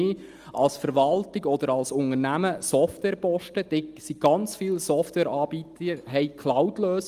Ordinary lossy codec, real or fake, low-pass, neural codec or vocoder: Opus, 32 kbps; real; 14.4 kHz; none